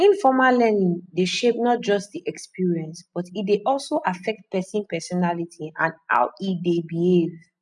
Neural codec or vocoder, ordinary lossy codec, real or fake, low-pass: none; none; real; 10.8 kHz